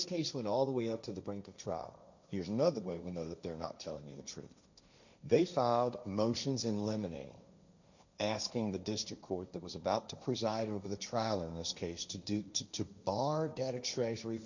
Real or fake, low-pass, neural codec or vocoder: fake; 7.2 kHz; codec, 16 kHz, 1.1 kbps, Voila-Tokenizer